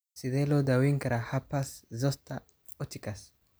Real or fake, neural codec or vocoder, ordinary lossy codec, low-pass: real; none; none; none